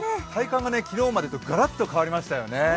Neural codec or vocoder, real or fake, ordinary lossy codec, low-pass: none; real; none; none